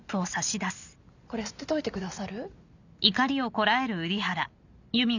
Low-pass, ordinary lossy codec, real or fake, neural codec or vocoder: 7.2 kHz; none; real; none